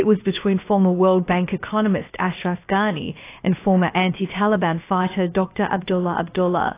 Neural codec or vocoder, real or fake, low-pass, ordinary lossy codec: codec, 16 kHz, 0.7 kbps, FocalCodec; fake; 3.6 kHz; AAC, 24 kbps